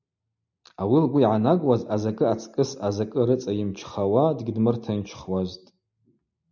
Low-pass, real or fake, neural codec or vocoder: 7.2 kHz; real; none